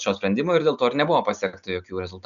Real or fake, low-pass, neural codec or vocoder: real; 7.2 kHz; none